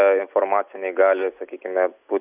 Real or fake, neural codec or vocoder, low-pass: real; none; 3.6 kHz